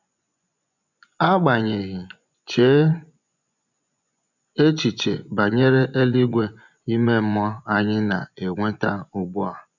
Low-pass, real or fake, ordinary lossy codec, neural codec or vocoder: 7.2 kHz; fake; none; vocoder, 24 kHz, 100 mel bands, Vocos